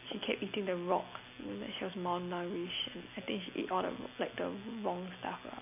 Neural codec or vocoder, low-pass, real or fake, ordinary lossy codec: none; 3.6 kHz; real; none